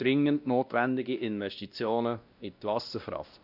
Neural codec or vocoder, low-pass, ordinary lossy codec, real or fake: codec, 16 kHz, 1 kbps, X-Codec, WavLM features, trained on Multilingual LibriSpeech; 5.4 kHz; none; fake